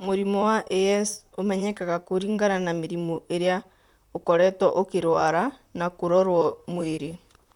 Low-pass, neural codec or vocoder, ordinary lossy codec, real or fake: 19.8 kHz; vocoder, 44.1 kHz, 128 mel bands, Pupu-Vocoder; none; fake